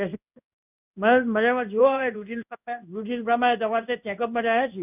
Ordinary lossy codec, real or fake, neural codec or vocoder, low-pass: none; fake; codec, 16 kHz in and 24 kHz out, 1 kbps, XY-Tokenizer; 3.6 kHz